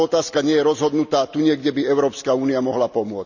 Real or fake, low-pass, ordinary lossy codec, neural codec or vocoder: real; 7.2 kHz; none; none